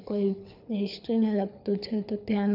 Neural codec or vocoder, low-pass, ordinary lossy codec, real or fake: codec, 24 kHz, 6 kbps, HILCodec; 5.4 kHz; none; fake